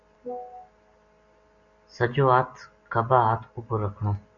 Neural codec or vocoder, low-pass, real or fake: none; 7.2 kHz; real